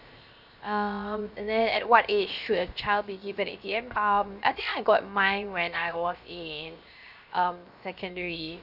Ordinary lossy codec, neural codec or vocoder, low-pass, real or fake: none; codec, 16 kHz, 0.7 kbps, FocalCodec; 5.4 kHz; fake